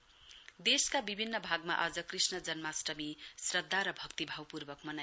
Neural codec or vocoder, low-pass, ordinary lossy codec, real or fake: none; none; none; real